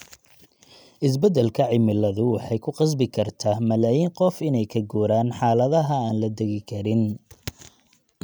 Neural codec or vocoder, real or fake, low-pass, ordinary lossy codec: none; real; none; none